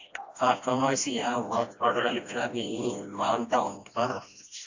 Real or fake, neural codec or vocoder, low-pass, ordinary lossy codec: fake; codec, 16 kHz, 1 kbps, FreqCodec, smaller model; 7.2 kHz; AAC, 48 kbps